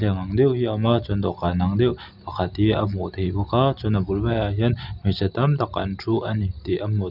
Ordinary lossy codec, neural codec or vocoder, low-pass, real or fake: none; none; 5.4 kHz; real